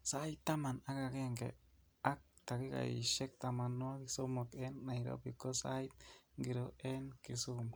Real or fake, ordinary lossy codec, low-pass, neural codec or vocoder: real; none; none; none